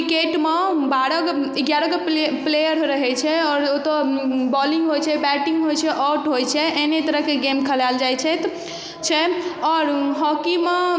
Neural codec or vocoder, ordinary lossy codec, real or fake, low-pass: none; none; real; none